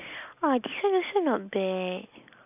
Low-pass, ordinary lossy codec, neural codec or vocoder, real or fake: 3.6 kHz; none; none; real